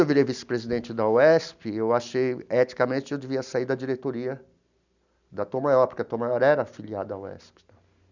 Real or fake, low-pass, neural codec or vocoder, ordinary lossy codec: real; 7.2 kHz; none; none